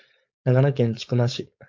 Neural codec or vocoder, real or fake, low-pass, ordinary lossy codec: codec, 16 kHz, 4.8 kbps, FACodec; fake; 7.2 kHz; AAC, 48 kbps